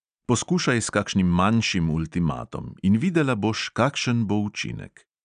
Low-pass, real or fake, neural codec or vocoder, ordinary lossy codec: 9.9 kHz; real; none; none